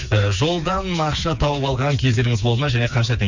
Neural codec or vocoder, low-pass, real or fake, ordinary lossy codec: codec, 16 kHz, 4 kbps, FreqCodec, smaller model; 7.2 kHz; fake; Opus, 64 kbps